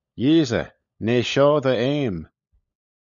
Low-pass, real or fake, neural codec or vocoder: 7.2 kHz; fake; codec, 16 kHz, 16 kbps, FunCodec, trained on LibriTTS, 50 frames a second